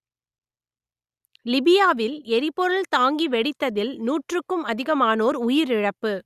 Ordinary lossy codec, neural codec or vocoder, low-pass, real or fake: Opus, 64 kbps; none; 14.4 kHz; real